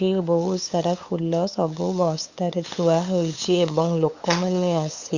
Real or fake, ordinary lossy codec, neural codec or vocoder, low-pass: fake; Opus, 64 kbps; codec, 16 kHz, 8 kbps, FunCodec, trained on LibriTTS, 25 frames a second; 7.2 kHz